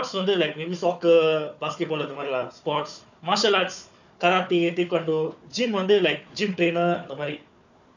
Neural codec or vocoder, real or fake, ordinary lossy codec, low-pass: codec, 16 kHz, 4 kbps, FunCodec, trained on Chinese and English, 50 frames a second; fake; none; 7.2 kHz